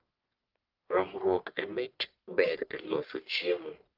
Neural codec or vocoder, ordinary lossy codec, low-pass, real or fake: codec, 44.1 kHz, 1.7 kbps, Pupu-Codec; Opus, 24 kbps; 5.4 kHz; fake